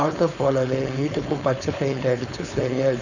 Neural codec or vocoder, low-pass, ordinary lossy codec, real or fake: codec, 16 kHz, 4.8 kbps, FACodec; 7.2 kHz; none; fake